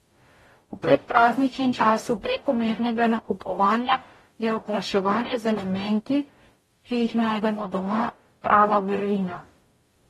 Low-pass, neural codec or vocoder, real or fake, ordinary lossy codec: 19.8 kHz; codec, 44.1 kHz, 0.9 kbps, DAC; fake; AAC, 32 kbps